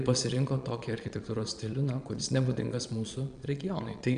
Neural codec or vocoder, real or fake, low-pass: vocoder, 22.05 kHz, 80 mel bands, Vocos; fake; 9.9 kHz